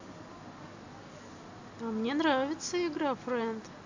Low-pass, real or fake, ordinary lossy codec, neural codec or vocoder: 7.2 kHz; real; AAC, 48 kbps; none